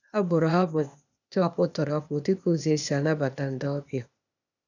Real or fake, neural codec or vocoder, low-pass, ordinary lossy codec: fake; codec, 16 kHz, 0.8 kbps, ZipCodec; 7.2 kHz; none